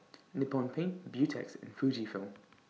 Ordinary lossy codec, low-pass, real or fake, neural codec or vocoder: none; none; real; none